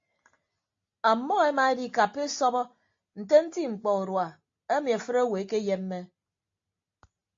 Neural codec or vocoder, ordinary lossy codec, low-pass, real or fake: none; AAC, 48 kbps; 7.2 kHz; real